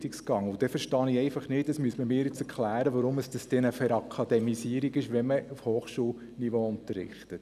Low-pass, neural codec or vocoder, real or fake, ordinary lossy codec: 14.4 kHz; none; real; none